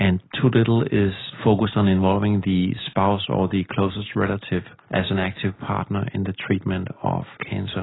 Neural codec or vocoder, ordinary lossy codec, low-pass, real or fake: none; AAC, 16 kbps; 7.2 kHz; real